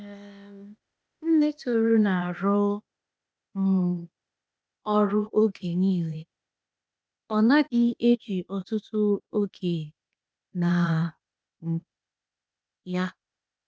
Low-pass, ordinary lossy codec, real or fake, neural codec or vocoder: none; none; fake; codec, 16 kHz, 0.8 kbps, ZipCodec